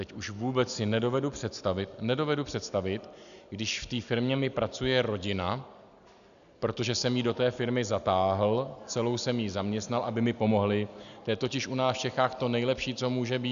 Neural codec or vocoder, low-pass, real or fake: none; 7.2 kHz; real